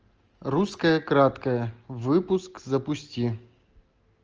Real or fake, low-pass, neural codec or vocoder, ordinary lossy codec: real; 7.2 kHz; none; Opus, 24 kbps